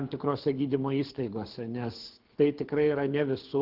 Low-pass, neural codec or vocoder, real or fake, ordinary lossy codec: 5.4 kHz; codec, 24 kHz, 6 kbps, HILCodec; fake; Opus, 16 kbps